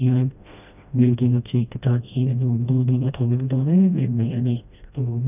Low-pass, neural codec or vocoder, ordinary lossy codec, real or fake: 3.6 kHz; codec, 16 kHz, 1 kbps, FreqCodec, smaller model; none; fake